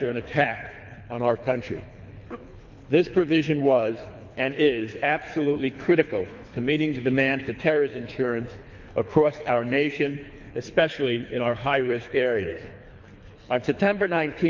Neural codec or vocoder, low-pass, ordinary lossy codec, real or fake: codec, 24 kHz, 3 kbps, HILCodec; 7.2 kHz; MP3, 48 kbps; fake